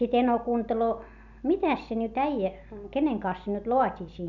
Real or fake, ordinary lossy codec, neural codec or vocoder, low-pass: real; none; none; 7.2 kHz